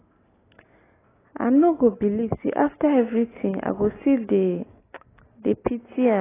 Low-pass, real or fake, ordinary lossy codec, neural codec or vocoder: 3.6 kHz; real; AAC, 16 kbps; none